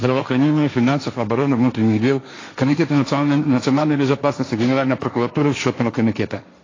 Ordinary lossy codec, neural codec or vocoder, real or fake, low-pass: AAC, 32 kbps; codec, 16 kHz, 1.1 kbps, Voila-Tokenizer; fake; 7.2 kHz